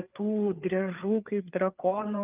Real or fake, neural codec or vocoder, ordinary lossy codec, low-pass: fake; codec, 16 kHz, 4 kbps, FreqCodec, larger model; Opus, 24 kbps; 3.6 kHz